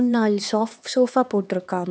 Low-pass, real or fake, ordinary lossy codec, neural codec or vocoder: none; fake; none; codec, 16 kHz, 2 kbps, X-Codec, HuBERT features, trained on LibriSpeech